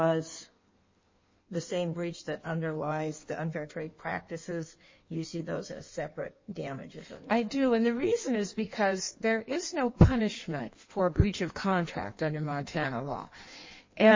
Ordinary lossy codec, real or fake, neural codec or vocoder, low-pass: MP3, 32 kbps; fake; codec, 16 kHz in and 24 kHz out, 1.1 kbps, FireRedTTS-2 codec; 7.2 kHz